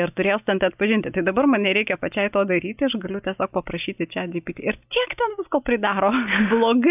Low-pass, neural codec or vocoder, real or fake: 3.6 kHz; none; real